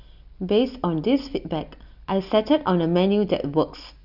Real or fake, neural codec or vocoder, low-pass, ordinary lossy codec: real; none; 5.4 kHz; none